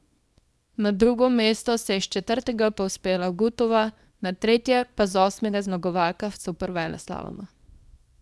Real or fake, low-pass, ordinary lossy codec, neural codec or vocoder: fake; none; none; codec, 24 kHz, 0.9 kbps, WavTokenizer, small release